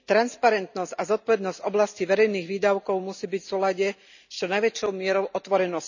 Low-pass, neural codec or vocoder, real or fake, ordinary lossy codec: 7.2 kHz; none; real; none